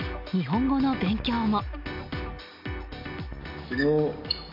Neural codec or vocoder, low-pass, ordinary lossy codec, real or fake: none; 5.4 kHz; MP3, 48 kbps; real